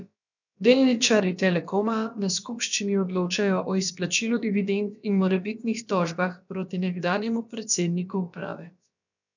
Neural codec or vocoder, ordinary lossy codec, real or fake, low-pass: codec, 16 kHz, about 1 kbps, DyCAST, with the encoder's durations; none; fake; 7.2 kHz